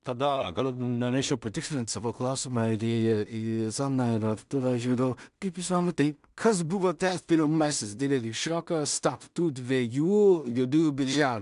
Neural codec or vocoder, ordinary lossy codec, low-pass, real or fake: codec, 16 kHz in and 24 kHz out, 0.4 kbps, LongCat-Audio-Codec, two codebook decoder; MP3, 96 kbps; 10.8 kHz; fake